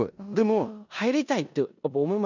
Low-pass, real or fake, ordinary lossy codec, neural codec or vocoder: 7.2 kHz; fake; none; codec, 16 kHz in and 24 kHz out, 0.9 kbps, LongCat-Audio-Codec, four codebook decoder